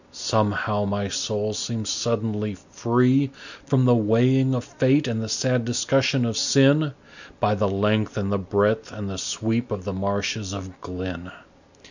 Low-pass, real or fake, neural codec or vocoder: 7.2 kHz; real; none